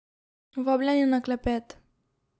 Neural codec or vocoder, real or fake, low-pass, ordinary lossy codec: none; real; none; none